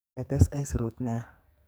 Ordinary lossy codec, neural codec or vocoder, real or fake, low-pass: none; codec, 44.1 kHz, 2.6 kbps, SNAC; fake; none